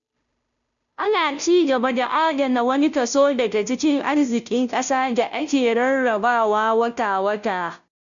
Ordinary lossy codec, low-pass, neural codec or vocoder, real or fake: none; 7.2 kHz; codec, 16 kHz, 0.5 kbps, FunCodec, trained on Chinese and English, 25 frames a second; fake